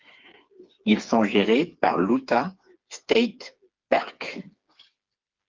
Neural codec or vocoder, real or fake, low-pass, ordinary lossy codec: codec, 16 kHz, 4 kbps, FreqCodec, smaller model; fake; 7.2 kHz; Opus, 32 kbps